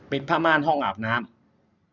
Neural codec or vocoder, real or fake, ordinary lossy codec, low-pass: none; real; none; 7.2 kHz